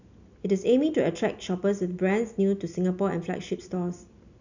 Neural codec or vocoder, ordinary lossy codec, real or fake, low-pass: none; none; real; 7.2 kHz